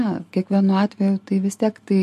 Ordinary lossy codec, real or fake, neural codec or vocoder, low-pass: MP3, 64 kbps; real; none; 14.4 kHz